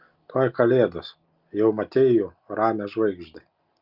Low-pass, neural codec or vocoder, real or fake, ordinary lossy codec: 5.4 kHz; none; real; Opus, 32 kbps